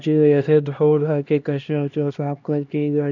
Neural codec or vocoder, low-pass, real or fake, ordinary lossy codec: codec, 16 kHz, 1 kbps, X-Codec, HuBERT features, trained on LibriSpeech; 7.2 kHz; fake; none